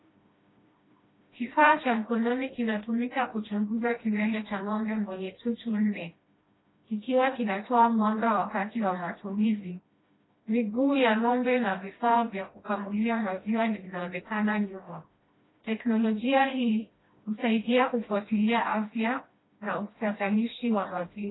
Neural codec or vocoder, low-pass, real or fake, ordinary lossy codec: codec, 16 kHz, 1 kbps, FreqCodec, smaller model; 7.2 kHz; fake; AAC, 16 kbps